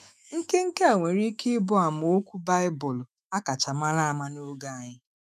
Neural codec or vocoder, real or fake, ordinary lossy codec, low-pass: autoencoder, 48 kHz, 128 numbers a frame, DAC-VAE, trained on Japanese speech; fake; none; 14.4 kHz